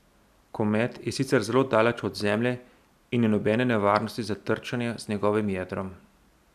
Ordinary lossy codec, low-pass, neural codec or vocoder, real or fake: none; 14.4 kHz; none; real